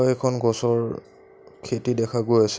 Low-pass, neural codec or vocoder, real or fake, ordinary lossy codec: none; none; real; none